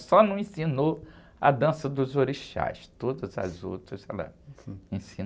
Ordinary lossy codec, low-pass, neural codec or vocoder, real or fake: none; none; none; real